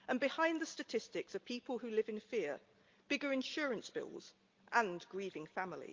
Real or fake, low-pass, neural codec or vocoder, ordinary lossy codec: real; 7.2 kHz; none; Opus, 32 kbps